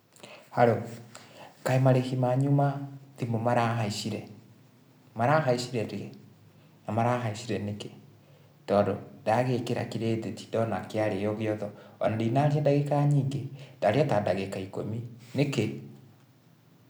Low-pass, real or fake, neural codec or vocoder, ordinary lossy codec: none; real; none; none